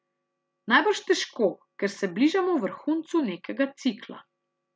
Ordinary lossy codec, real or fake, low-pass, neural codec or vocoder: none; real; none; none